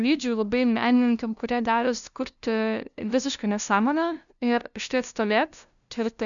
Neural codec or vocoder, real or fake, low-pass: codec, 16 kHz, 0.5 kbps, FunCodec, trained on LibriTTS, 25 frames a second; fake; 7.2 kHz